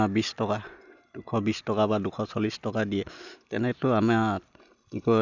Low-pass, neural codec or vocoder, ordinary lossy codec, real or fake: 7.2 kHz; none; none; real